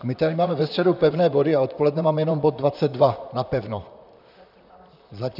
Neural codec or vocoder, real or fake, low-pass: vocoder, 44.1 kHz, 128 mel bands, Pupu-Vocoder; fake; 5.4 kHz